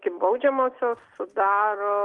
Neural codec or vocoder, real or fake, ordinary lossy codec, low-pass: none; real; Opus, 24 kbps; 10.8 kHz